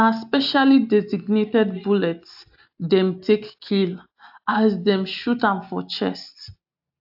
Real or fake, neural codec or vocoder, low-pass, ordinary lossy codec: real; none; 5.4 kHz; AAC, 48 kbps